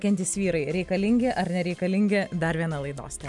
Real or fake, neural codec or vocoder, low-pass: real; none; 10.8 kHz